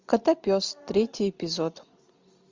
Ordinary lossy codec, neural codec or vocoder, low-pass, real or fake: MP3, 64 kbps; none; 7.2 kHz; real